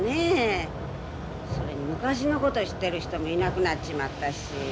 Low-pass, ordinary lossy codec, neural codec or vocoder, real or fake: none; none; none; real